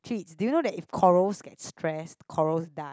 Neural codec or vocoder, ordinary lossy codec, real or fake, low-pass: none; none; real; none